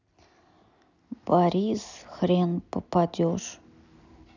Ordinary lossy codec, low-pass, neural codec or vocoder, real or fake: none; 7.2 kHz; none; real